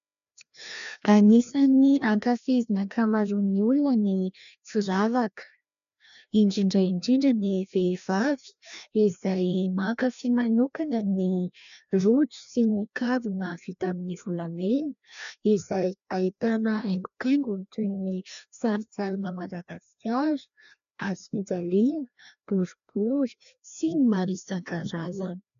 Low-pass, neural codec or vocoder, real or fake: 7.2 kHz; codec, 16 kHz, 1 kbps, FreqCodec, larger model; fake